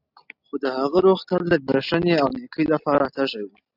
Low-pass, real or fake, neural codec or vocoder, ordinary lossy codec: 5.4 kHz; real; none; AAC, 48 kbps